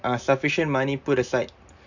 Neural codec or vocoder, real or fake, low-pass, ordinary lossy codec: none; real; 7.2 kHz; none